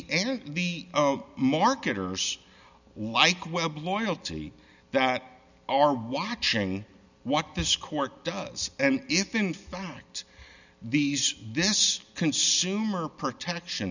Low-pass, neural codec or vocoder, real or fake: 7.2 kHz; none; real